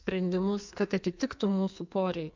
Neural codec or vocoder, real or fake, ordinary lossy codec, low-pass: codec, 44.1 kHz, 2.6 kbps, SNAC; fake; MP3, 64 kbps; 7.2 kHz